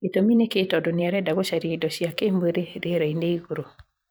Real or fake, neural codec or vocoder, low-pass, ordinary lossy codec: fake; vocoder, 44.1 kHz, 128 mel bands every 512 samples, BigVGAN v2; none; none